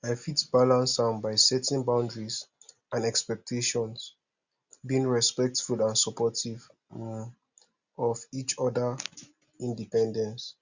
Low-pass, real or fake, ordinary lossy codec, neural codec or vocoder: 7.2 kHz; real; Opus, 64 kbps; none